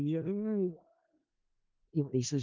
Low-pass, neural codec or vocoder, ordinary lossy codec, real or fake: 7.2 kHz; codec, 16 kHz in and 24 kHz out, 0.4 kbps, LongCat-Audio-Codec, four codebook decoder; Opus, 24 kbps; fake